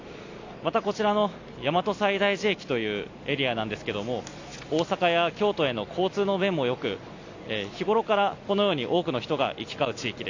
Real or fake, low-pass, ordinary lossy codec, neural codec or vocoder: real; 7.2 kHz; AAC, 48 kbps; none